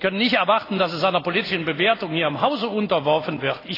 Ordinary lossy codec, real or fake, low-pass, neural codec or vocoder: AAC, 24 kbps; real; 5.4 kHz; none